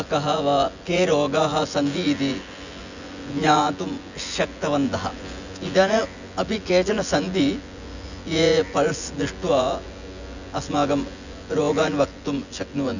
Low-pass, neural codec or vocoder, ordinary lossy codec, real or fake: 7.2 kHz; vocoder, 24 kHz, 100 mel bands, Vocos; none; fake